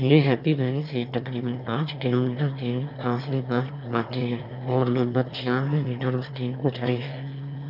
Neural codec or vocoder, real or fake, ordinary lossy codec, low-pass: autoencoder, 22.05 kHz, a latent of 192 numbers a frame, VITS, trained on one speaker; fake; none; 5.4 kHz